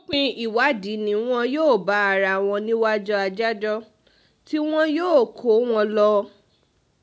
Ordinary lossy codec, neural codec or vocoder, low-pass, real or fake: none; none; none; real